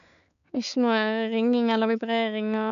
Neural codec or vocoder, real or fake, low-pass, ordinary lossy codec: codec, 16 kHz, 4 kbps, X-Codec, HuBERT features, trained on balanced general audio; fake; 7.2 kHz; none